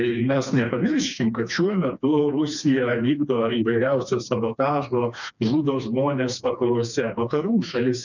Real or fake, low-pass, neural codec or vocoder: fake; 7.2 kHz; codec, 16 kHz, 2 kbps, FreqCodec, smaller model